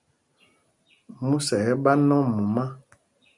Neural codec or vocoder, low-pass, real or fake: none; 10.8 kHz; real